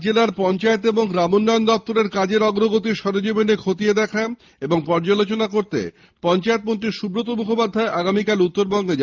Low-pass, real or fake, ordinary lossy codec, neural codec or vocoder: 7.2 kHz; real; Opus, 32 kbps; none